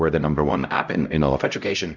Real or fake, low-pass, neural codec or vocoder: fake; 7.2 kHz; codec, 16 kHz, 0.5 kbps, X-Codec, HuBERT features, trained on LibriSpeech